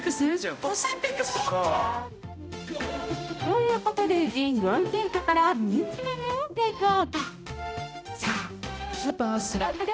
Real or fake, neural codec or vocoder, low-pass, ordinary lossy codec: fake; codec, 16 kHz, 0.5 kbps, X-Codec, HuBERT features, trained on balanced general audio; none; none